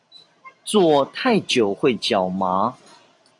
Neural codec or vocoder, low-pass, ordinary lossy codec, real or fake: vocoder, 44.1 kHz, 128 mel bands every 512 samples, BigVGAN v2; 10.8 kHz; AAC, 64 kbps; fake